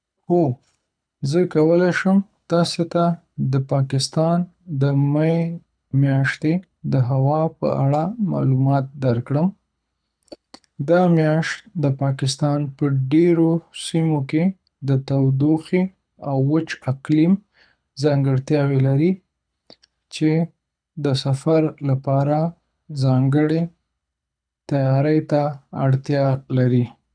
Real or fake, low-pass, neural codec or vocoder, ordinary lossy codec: fake; 9.9 kHz; codec, 24 kHz, 6 kbps, HILCodec; none